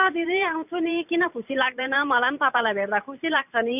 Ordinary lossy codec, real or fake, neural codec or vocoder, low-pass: none; fake; vocoder, 44.1 kHz, 128 mel bands every 512 samples, BigVGAN v2; 3.6 kHz